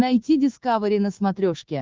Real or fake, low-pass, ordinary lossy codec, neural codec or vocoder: fake; 7.2 kHz; Opus, 16 kbps; codec, 24 kHz, 3.1 kbps, DualCodec